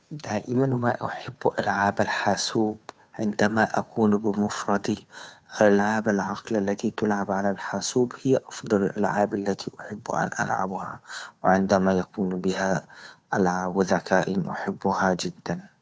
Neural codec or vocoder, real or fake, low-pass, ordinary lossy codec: codec, 16 kHz, 2 kbps, FunCodec, trained on Chinese and English, 25 frames a second; fake; none; none